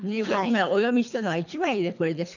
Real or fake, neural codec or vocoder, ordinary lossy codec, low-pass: fake; codec, 24 kHz, 3 kbps, HILCodec; none; 7.2 kHz